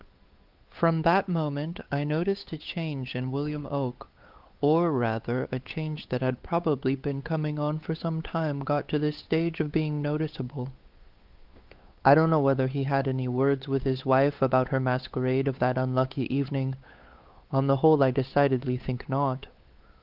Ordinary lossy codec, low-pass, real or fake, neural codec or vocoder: Opus, 32 kbps; 5.4 kHz; fake; codec, 16 kHz, 8 kbps, FunCodec, trained on Chinese and English, 25 frames a second